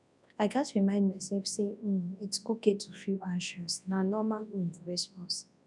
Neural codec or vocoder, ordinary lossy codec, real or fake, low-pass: codec, 24 kHz, 0.9 kbps, WavTokenizer, large speech release; none; fake; 10.8 kHz